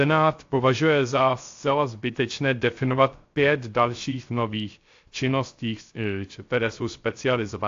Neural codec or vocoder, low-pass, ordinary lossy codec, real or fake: codec, 16 kHz, 0.3 kbps, FocalCodec; 7.2 kHz; AAC, 48 kbps; fake